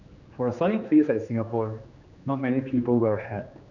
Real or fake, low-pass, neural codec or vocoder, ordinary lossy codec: fake; 7.2 kHz; codec, 16 kHz, 2 kbps, X-Codec, HuBERT features, trained on general audio; none